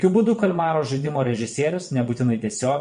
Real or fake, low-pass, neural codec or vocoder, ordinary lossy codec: fake; 9.9 kHz; vocoder, 22.05 kHz, 80 mel bands, WaveNeXt; MP3, 48 kbps